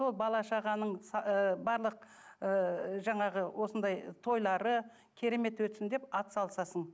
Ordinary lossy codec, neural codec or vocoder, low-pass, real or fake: none; none; none; real